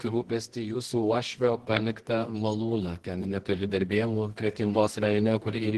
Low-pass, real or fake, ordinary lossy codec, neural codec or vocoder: 10.8 kHz; fake; Opus, 16 kbps; codec, 24 kHz, 0.9 kbps, WavTokenizer, medium music audio release